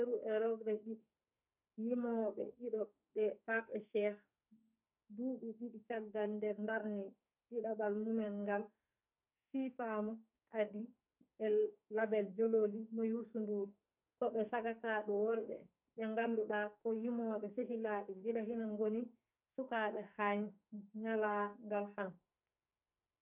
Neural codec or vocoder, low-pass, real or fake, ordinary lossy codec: codec, 44.1 kHz, 2.6 kbps, SNAC; 3.6 kHz; fake; none